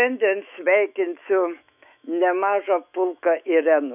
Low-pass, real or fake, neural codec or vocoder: 3.6 kHz; fake; autoencoder, 48 kHz, 128 numbers a frame, DAC-VAE, trained on Japanese speech